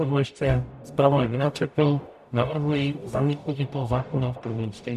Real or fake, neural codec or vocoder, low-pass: fake; codec, 44.1 kHz, 0.9 kbps, DAC; 14.4 kHz